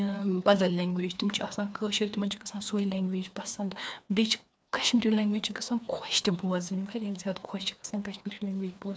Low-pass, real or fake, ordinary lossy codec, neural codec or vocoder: none; fake; none; codec, 16 kHz, 2 kbps, FreqCodec, larger model